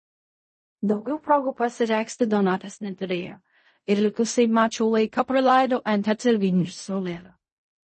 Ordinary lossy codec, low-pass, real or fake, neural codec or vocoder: MP3, 32 kbps; 10.8 kHz; fake; codec, 16 kHz in and 24 kHz out, 0.4 kbps, LongCat-Audio-Codec, fine tuned four codebook decoder